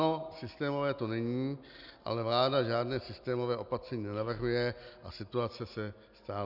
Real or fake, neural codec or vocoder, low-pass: real; none; 5.4 kHz